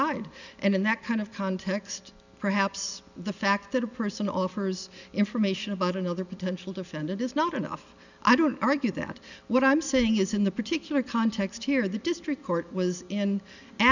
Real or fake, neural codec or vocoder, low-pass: real; none; 7.2 kHz